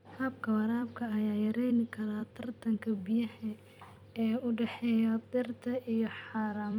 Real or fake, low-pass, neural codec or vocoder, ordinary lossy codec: fake; 19.8 kHz; vocoder, 44.1 kHz, 128 mel bands every 256 samples, BigVGAN v2; none